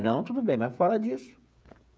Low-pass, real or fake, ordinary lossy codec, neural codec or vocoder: none; fake; none; codec, 16 kHz, 16 kbps, FreqCodec, smaller model